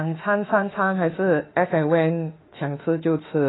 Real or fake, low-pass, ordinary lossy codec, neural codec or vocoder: fake; 7.2 kHz; AAC, 16 kbps; autoencoder, 48 kHz, 32 numbers a frame, DAC-VAE, trained on Japanese speech